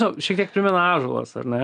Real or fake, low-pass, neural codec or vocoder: real; 9.9 kHz; none